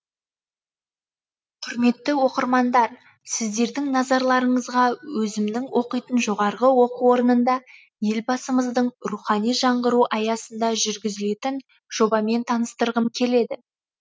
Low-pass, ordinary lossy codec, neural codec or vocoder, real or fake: none; none; none; real